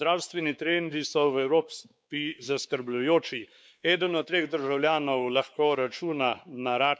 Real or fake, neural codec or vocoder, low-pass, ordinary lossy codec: fake; codec, 16 kHz, 4 kbps, X-Codec, WavLM features, trained on Multilingual LibriSpeech; none; none